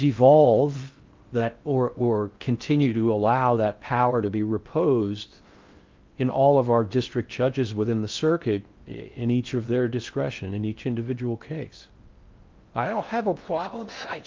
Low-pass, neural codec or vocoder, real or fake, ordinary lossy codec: 7.2 kHz; codec, 16 kHz in and 24 kHz out, 0.6 kbps, FocalCodec, streaming, 4096 codes; fake; Opus, 32 kbps